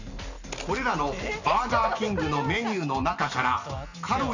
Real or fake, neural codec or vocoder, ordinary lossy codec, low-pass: real; none; none; 7.2 kHz